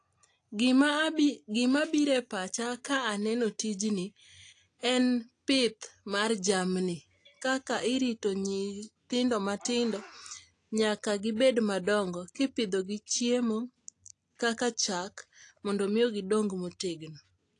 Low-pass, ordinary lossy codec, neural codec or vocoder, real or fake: 9.9 kHz; AAC, 48 kbps; none; real